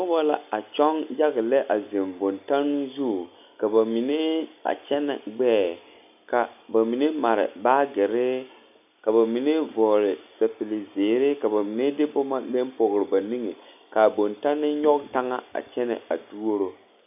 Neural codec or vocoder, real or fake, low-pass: none; real; 3.6 kHz